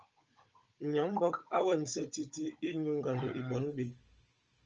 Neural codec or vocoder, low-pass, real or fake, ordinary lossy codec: codec, 16 kHz, 16 kbps, FunCodec, trained on Chinese and English, 50 frames a second; 7.2 kHz; fake; Opus, 24 kbps